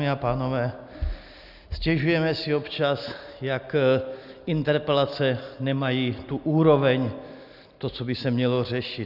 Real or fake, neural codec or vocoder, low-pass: real; none; 5.4 kHz